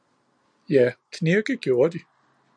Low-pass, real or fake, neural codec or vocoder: 9.9 kHz; real; none